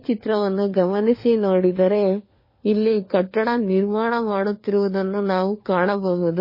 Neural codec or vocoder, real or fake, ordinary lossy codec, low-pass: codec, 16 kHz, 4 kbps, FunCodec, trained on LibriTTS, 50 frames a second; fake; MP3, 24 kbps; 5.4 kHz